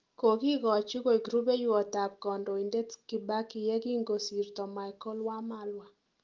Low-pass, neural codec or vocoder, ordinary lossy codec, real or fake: 7.2 kHz; none; Opus, 32 kbps; real